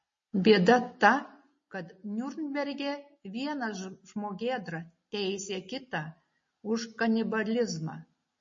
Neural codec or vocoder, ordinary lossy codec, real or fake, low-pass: none; MP3, 32 kbps; real; 7.2 kHz